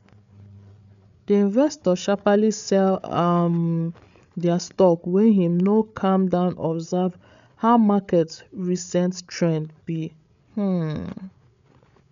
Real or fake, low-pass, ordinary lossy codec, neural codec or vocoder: fake; 7.2 kHz; none; codec, 16 kHz, 8 kbps, FreqCodec, larger model